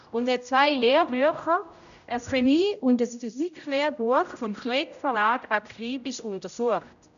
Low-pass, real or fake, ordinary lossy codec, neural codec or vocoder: 7.2 kHz; fake; none; codec, 16 kHz, 0.5 kbps, X-Codec, HuBERT features, trained on general audio